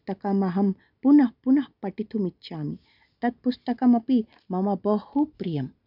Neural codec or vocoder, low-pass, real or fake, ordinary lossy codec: none; 5.4 kHz; real; none